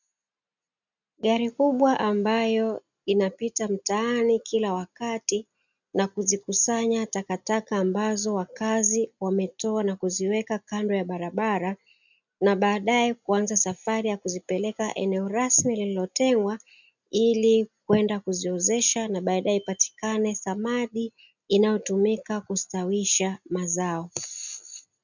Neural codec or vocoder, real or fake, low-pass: none; real; 7.2 kHz